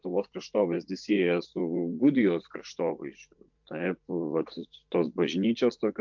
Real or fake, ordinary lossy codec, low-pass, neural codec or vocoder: fake; MP3, 64 kbps; 7.2 kHz; vocoder, 44.1 kHz, 80 mel bands, Vocos